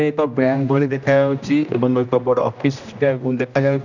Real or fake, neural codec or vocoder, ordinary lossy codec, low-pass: fake; codec, 16 kHz, 1 kbps, X-Codec, HuBERT features, trained on general audio; none; 7.2 kHz